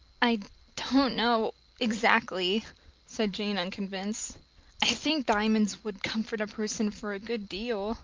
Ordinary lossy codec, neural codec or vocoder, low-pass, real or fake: Opus, 24 kbps; none; 7.2 kHz; real